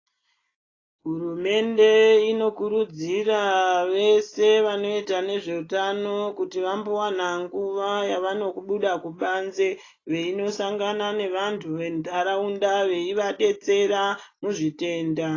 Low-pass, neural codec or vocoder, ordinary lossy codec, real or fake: 7.2 kHz; none; AAC, 32 kbps; real